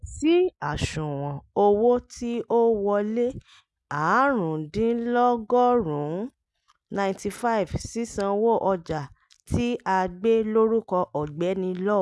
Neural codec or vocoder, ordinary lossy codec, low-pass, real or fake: none; none; none; real